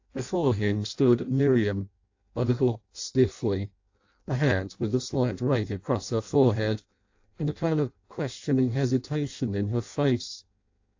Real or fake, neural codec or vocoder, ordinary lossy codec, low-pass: fake; codec, 16 kHz in and 24 kHz out, 0.6 kbps, FireRedTTS-2 codec; AAC, 48 kbps; 7.2 kHz